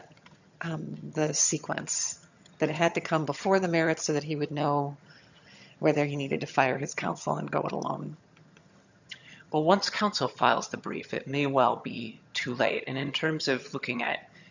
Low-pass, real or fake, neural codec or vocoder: 7.2 kHz; fake; vocoder, 22.05 kHz, 80 mel bands, HiFi-GAN